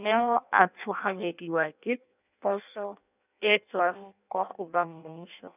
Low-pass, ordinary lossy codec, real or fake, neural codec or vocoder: 3.6 kHz; none; fake; codec, 16 kHz in and 24 kHz out, 0.6 kbps, FireRedTTS-2 codec